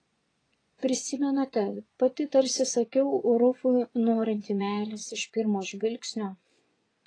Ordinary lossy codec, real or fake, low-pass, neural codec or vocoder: AAC, 32 kbps; fake; 9.9 kHz; vocoder, 24 kHz, 100 mel bands, Vocos